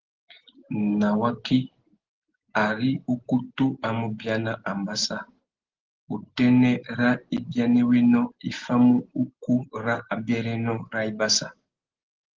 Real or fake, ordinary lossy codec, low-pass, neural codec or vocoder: real; Opus, 16 kbps; 7.2 kHz; none